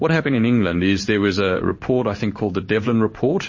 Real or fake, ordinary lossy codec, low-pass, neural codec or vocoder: fake; MP3, 32 kbps; 7.2 kHz; codec, 16 kHz in and 24 kHz out, 1 kbps, XY-Tokenizer